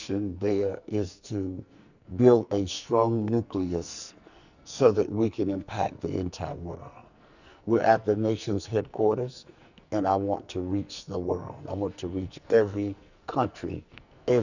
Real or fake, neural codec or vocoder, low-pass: fake; codec, 44.1 kHz, 2.6 kbps, SNAC; 7.2 kHz